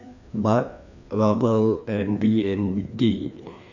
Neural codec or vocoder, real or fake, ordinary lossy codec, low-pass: codec, 16 kHz, 2 kbps, FreqCodec, larger model; fake; none; 7.2 kHz